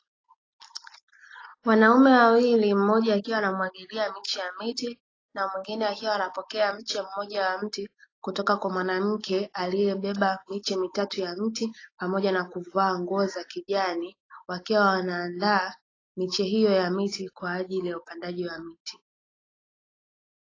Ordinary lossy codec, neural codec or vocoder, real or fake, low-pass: AAC, 32 kbps; none; real; 7.2 kHz